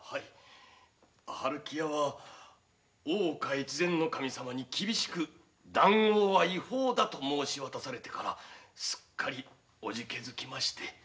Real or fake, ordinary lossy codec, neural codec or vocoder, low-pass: real; none; none; none